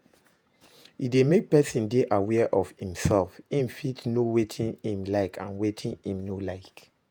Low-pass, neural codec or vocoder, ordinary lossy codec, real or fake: none; vocoder, 48 kHz, 128 mel bands, Vocos; none; fake